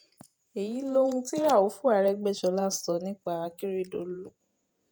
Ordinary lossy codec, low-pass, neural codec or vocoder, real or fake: none; none; vocoder, 48 kHz, 128 mel bands, Vocos; fake